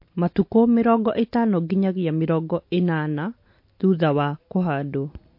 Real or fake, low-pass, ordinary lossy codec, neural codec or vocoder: real; 5.4 kHz; MP3, 32 kbps; none